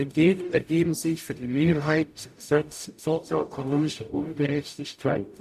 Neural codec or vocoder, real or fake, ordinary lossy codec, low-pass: codec, 44.1 kHz, 0.9 kbps, DAC; fake; MP3, 96 kbps; 14.4 kHz